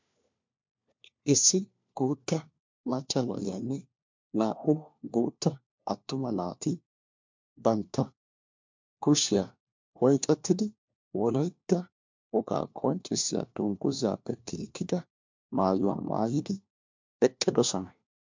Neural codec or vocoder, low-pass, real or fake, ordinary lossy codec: codec, 16 kHz, 1 kbps, FunCodec, trained on LibriTTS, 50 frames a second; 7.2 kHz; fake; MP3, 64 kbps